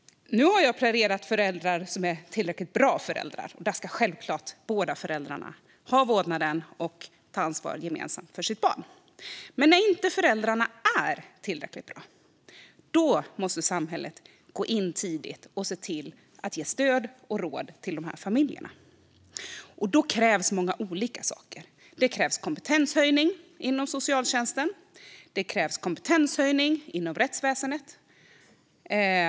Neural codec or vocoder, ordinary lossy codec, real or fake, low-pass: none; none; real; none